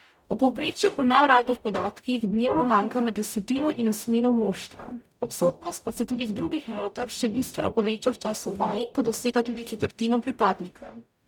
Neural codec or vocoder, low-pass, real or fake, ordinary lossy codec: codec, 44.1 kHz, 0.9 kbps, DAC; 19.8 kHz; fake; none